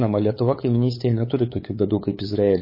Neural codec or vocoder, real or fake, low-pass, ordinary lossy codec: codec, 16 kHz, 8 kbps, FunCodec, trained on LibriTTS, 25 frames a second; fake; 5.4 kHz; MP3, 24 kbps